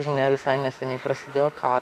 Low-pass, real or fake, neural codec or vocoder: 14.4 kHz; fake; autoencoder, 48 kHz, 32 numbers a frame, DAC-VAE, trained on Japanese speech